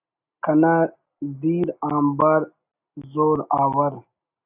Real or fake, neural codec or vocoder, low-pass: real; none; 3.6 kHz